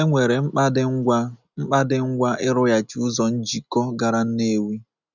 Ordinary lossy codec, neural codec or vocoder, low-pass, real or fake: none; none; 7.2 kHz; real